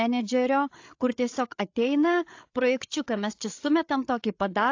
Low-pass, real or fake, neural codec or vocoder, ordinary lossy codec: 7.2 kHz; fake; codec, 16 kHz, 8 kbps, FreqCodec, larger model; AAC, 48 kbps